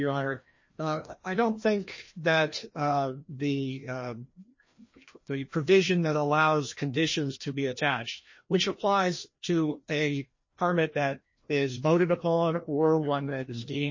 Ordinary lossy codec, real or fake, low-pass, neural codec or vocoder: MP3, 32 kbps; fake; 7.2 kHz; codec, 16 kHz, 1 kbps, FreqCodec, larger model